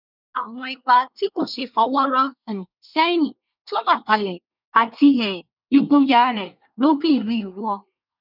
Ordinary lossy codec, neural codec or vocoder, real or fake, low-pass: none; codec, 24 kHz, 1 kbps, SNAC; fake; 5.4 kHz